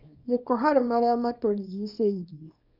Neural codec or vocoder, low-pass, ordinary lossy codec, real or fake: codec, 24 kHz, 0.9 kbps, WavTokenizer, small release; 5.4 kHz; Opus, 64 kbps; fake